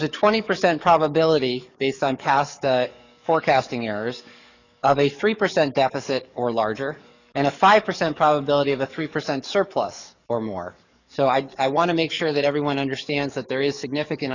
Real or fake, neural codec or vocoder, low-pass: fake; codec, 44.1 kHz, 7.8 kbps, DAC; 7.2 kHz